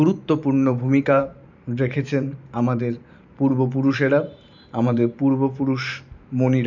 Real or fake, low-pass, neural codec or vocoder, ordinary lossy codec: fake; 7.2 kHz; vocoder, 44.1 kHz, 128 mel bands every 512 samples, BigVGAN v2; none